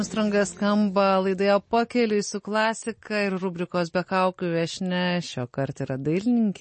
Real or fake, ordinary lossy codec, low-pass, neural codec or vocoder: real; MP3, 32 kbps; 10.8 kHz; none